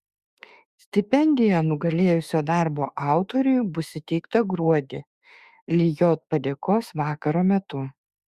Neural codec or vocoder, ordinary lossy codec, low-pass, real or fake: autoencoder, 48 kHz, 32 numbers a frame, DAC-VAE, trained on Japanese speech; Opus, 64 kbps; 14.4 kHz; fake